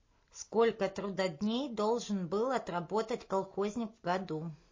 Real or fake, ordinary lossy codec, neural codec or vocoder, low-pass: real; MP3, 32 kbps; none; 7.2 kHz